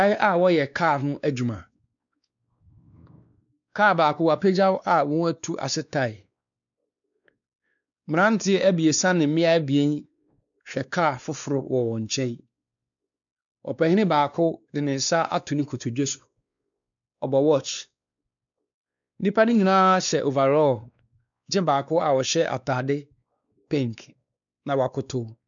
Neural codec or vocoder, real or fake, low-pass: codec, 16 kHz, 2 kbps, X-Codec, WavLM features, trained on Multilingual LibriSpeech; fake; 7.2 kHz